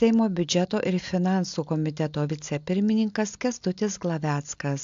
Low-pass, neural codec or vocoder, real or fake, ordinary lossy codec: 7.2 kHz; none; real; AAC, 64 kbps